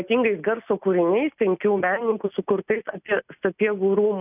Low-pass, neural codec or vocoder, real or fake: 3.6 kHz; none; real